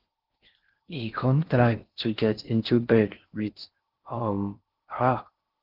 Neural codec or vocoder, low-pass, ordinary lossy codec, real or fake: codec, 16 kHz in and 24 kHz out, 0.6 kbps, FocalCodec, streaming, 4096 codes; 5.4 kHz; Opus, 16 kbps; fake